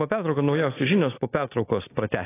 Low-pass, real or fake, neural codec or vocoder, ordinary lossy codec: 3.6 kHz; real; none; AAC, 16 kbps